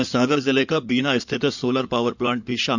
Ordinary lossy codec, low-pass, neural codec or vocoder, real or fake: none; 7.2 kHz; codec, 16 kHz in and 24 kHz out, 2.2 kbps, FireRedTTS-2 codec; fake